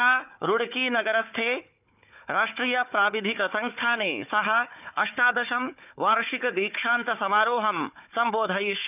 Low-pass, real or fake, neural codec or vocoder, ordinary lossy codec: 3.6 kHz; fake; codec, 16 kHz, 4 kbps, FunCodec, trained on Chinese and English, 50 frames a second; none